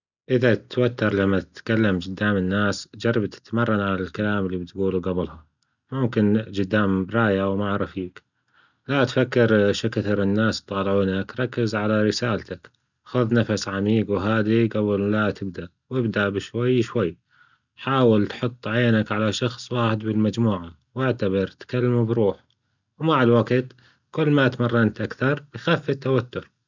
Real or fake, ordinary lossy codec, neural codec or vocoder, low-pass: real; none; none; 7.2 kHz